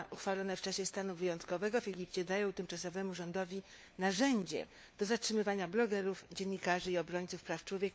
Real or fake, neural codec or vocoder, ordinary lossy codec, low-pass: fake; codec, 16 kHz, 4 kbps, FunCodec, trained on LibriTTS, 50 frames a second; none; none